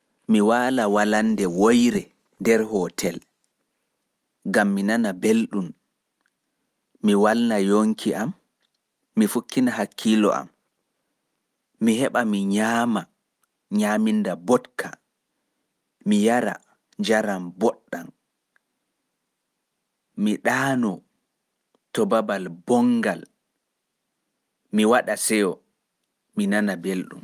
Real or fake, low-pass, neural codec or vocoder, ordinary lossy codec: real; 14.4 kHz; none; Opus, 32 kbps